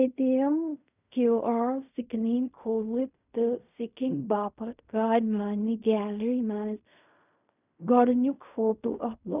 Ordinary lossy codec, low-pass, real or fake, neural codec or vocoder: none; 3.6 kHz; fake; codec, 16 kHz in and 24 kHz out, 0.4 kbps, LongCat-Audio-Codec, fine tuned four codebook decoder